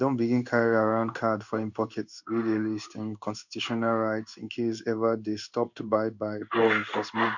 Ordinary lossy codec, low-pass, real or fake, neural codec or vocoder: none; 7.2 kHz; fake; codec, 16 kHz in and 24 kHz out, 1 kbps, XY-Tokenizer